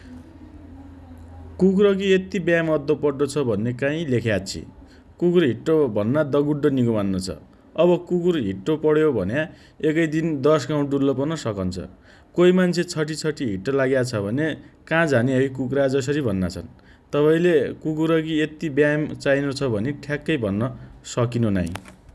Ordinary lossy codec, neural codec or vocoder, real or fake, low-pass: none; none; real; none